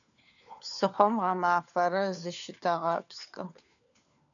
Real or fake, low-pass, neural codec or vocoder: fake; 7.2 kHz; codec, 16 kHz, 4 kbps, FunCodec, trained on LibriTTS, 50 frames a second